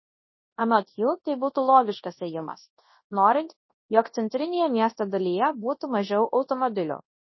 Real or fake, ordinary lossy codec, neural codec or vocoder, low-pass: fake; MP3, 24 kbps; codec, 24 kHz, 0.9 kbps, WavTokenizer, large speech release; 7.2 kHz